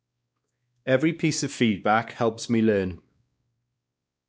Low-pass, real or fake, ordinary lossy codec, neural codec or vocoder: none; fake; none; codec, 16 kHz, 2 kbps, X-Codec, WavLM features, trained on Multilingual LibriSpeech